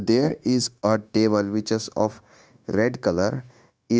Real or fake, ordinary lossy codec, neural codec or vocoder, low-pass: fake; none; codec, 16 kHz, 0.9 kbps, LongCat-Audio-Codec; none